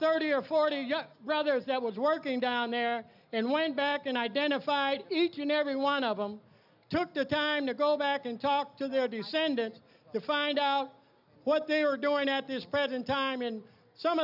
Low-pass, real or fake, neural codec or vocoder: 5.4 kHz; real; none